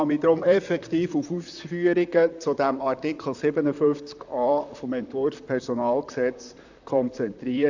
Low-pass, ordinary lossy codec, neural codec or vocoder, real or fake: 7.2 kHz; none; vocoder, 44.1 kHz, 128 mel bands, Pupu-Vocoder; fake